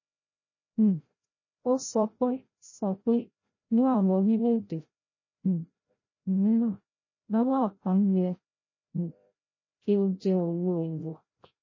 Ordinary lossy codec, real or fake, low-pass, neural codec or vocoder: MP3, 32 kbps; fake; 7.2 kHz; codec, 16 kHz, 0.5 kbps, FreqCodec, larger model